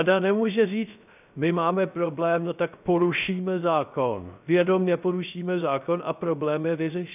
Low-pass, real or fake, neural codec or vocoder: 3.6 kHz; fake; codec, 16 kHz, 0.3 kbps, FocalCodec